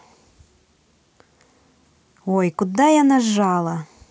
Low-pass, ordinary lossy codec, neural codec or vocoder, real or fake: none; none; none; real